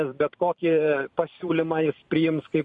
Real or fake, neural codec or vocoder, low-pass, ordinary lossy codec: fake; vocoder, 44.1 kHz, 128 mel bands every 512 samples, BigVGAN v2; 9.9 kHz; MP3, 48 kbps